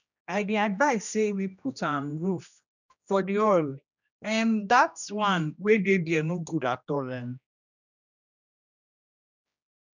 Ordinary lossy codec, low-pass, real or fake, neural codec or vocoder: none; 7.2 kHz; fake; codec, 16 kHz, 1 kbps, X-Codec, HuBERT features, trained on general audio